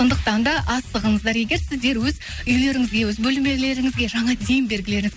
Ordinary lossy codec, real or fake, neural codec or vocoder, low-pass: none; real; none; none